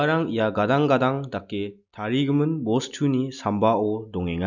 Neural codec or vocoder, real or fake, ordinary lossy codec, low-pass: none; real; none; 7.2 kHz